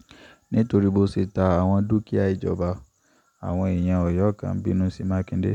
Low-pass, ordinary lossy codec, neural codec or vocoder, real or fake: 19.8 kHz; none; none; real